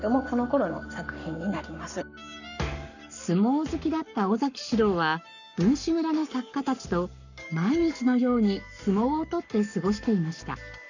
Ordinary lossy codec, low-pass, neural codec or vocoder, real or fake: none; 7.2 kHz; codec, 44.1 kHz, 7.8 kbps, Pupu-Codec; fake